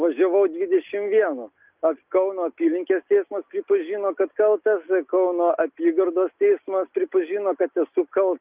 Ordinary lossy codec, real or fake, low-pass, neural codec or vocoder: Opus, 24 kbps; real; 3.6 kHz; none